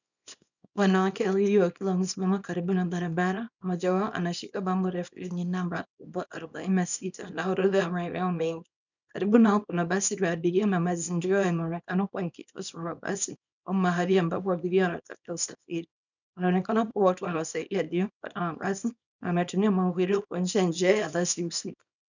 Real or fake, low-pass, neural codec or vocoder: fake; 7.2 kHz; codec, 24 kHz, 0.9 kbps, WavTokenizer, small release